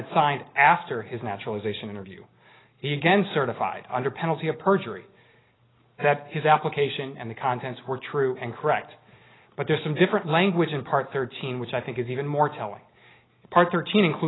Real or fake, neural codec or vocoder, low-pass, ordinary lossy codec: real; none; 7.2 kHz; AAC, 16 kbps